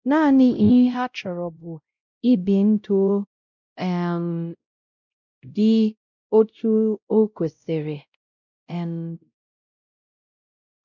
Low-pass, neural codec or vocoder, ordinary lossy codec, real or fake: 7.2 kHz; codec, 16 kHz, 0.5 kbps, X-Codec, WavLM features, trained on Multilingual LibriSpeech; none; fake